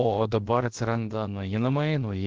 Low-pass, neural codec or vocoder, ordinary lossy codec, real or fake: 7.2 kHz; codec, 16 kHz, about 1 kbps, DyCAST, with the encoder's durations; Opus, 16 kbps; fake